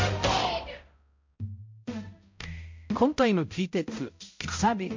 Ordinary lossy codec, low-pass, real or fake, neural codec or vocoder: MP3, 48 kbps; 7.2 kHz; fake; codec, 16 kHz, 0.5 kbps, X-Codec, HuBERT features, trained on balanced general audio